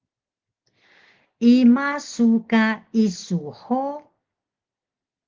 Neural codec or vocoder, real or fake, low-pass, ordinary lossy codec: none; real; 7.2 kHz; Opus, 16 kbps